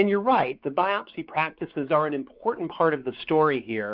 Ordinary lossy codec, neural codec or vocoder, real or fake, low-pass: Opus, 64 kbps; codec, 16 kHz, 4 kbps, X-Codec, WavLM features, trained on Multilingual LibriSpeech; fake; 5.4 kHz